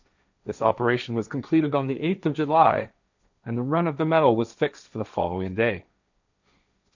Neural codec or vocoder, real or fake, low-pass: codec, 16 kHz, 1.1 kbps, Voila-Tokenizer; fake; 7.2 kHz